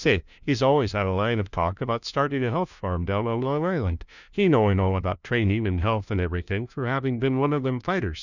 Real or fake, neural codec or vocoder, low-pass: fake; codec, 16 kHz, 1 kbps, FunCodec, trained on LibriTTS, 50 frames a second; 7.2 kHz